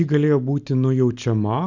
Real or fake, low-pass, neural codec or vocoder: real; 7.2 kHz; none